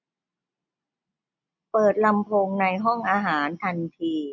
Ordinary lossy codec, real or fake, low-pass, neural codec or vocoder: none; real; 7.2 kHz; none